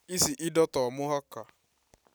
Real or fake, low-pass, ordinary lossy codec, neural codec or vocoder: real; none; none; none